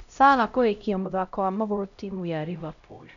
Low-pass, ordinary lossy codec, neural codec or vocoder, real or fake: 7.2 kHz; none; codec, 16 kHz, 0.5 kbps, X-Codec, HuBERT features, trained on LibriSpeech; fake